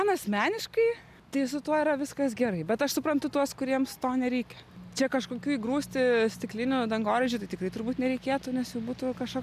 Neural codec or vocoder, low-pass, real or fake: none; 14.4 kHz; real